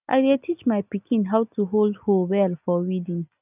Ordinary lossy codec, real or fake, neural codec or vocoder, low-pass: none; real; none; 3.6 kHz